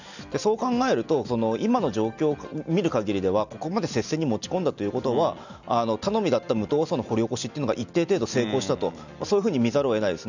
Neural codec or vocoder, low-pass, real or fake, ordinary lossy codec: none; 7.2 kHz; real; none